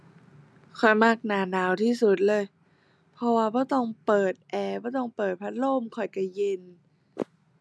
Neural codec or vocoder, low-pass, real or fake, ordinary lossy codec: none; none; real; none